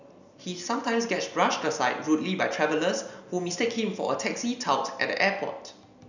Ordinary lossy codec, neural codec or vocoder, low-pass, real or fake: none; none; 7.2 kHz; real